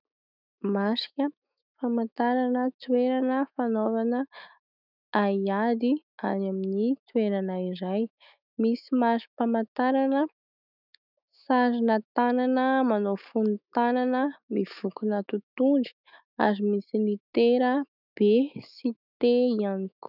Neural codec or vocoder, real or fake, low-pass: autoencoder, 48 kHz, 128 numbers a frame, DAC-VAE, trained on Japanese speech; fake; 5.4 kHz